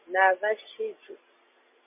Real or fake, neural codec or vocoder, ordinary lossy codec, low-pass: real; none; MP3, 32 kbps; 3.6 kHz